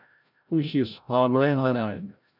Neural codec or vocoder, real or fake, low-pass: codec, 16 kHz, 0.5 kbps, FreqCodec, larger model; fake; 5.4 kHz